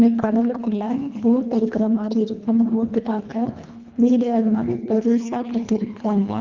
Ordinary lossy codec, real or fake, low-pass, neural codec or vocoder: Opus, 32 kbps; fake; 7.2 kHz; codec, 24 kHz, 1.5 kbps, HILCodec